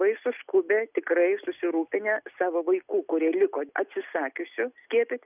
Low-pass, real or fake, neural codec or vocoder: 3.6 kHz; real; none